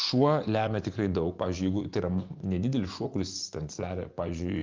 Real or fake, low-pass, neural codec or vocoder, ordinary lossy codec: fake; 7.2 kHz; vocoder, 24 kHz, 100 mel bands, Vocos; Opus, 24 kbps